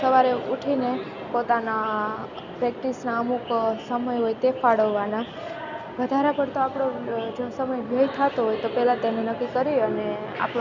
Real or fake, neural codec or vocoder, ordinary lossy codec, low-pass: real; none; none; 7.2 kHz